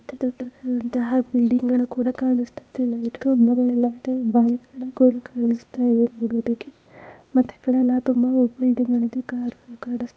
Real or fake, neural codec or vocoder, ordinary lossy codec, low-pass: fake; codec, 16 kHz, 0.8 kbps, ZipCodec; none; none